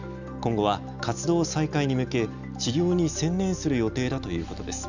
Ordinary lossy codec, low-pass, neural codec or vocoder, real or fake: none; 7.2 kHz; none; real